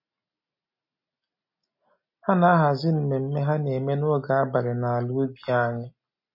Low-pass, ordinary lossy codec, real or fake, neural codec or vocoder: 5.4 kHz; MP3, 24 kbps; real; none